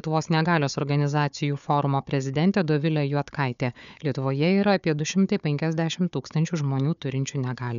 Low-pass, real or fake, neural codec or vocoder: 7.2 kHz; fake; codec, 16 kHz, 4 kbps, FunCodec, trained on Chinese and English, 50 frames a second